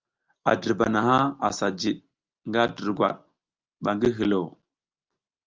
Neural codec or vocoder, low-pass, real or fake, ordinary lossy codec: none; 7.2 kHz; real; Opus, 32 kbps